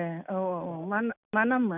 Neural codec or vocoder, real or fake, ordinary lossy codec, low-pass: none; real; none; 3.6 kHz